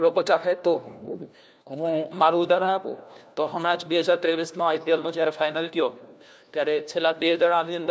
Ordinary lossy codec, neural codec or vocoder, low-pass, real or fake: none; codec, 16 kHz, 1 kbps, FunCodec, trained on LibriTTS, 50 frames a second; none; fake